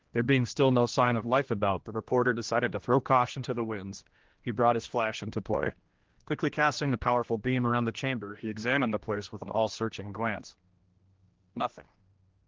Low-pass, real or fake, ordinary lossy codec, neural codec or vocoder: 7.2 kHz; fake; Opus, 16 kbps; codec, 16 kHz, 1 kbps, X-Codec, HuBERT features, trained on general audio